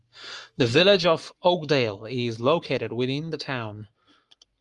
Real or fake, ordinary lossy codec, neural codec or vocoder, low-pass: fake; Opus, 32 kbps; codec, 44.1 kHz, 7.8 kbps, Pupu-Codec; 10.8 kHz